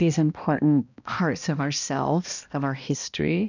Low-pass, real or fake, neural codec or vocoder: 7.2 kHz; fake; codec, 16 kHz, 1 kbps, X-Codec, HuBERT features, trained on balanced general audio